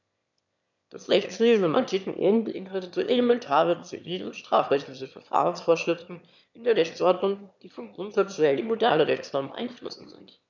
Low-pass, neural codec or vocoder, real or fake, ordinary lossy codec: 7.2 kHz; autoencoder, 22.05 kHz, a latent of 192 numbers a frame, VITS, trained on one speaker; fake; none